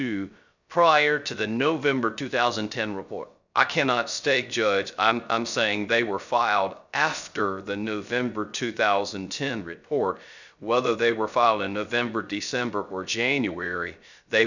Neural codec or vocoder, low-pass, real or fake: codec, 16 kHz, 0.3 kbps, FocalCodec; 7.2 kHz; fake